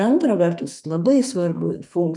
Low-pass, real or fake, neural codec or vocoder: 10.8 kHz; fake; codec, 44.1 kHz, 2.6 kbps, SNAC